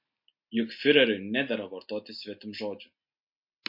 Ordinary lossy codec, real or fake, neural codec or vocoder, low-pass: MP3, 32 kbps; real; none; 5.4 kHz